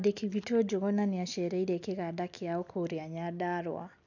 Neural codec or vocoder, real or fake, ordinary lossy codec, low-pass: none; real; none; 7.2 kHz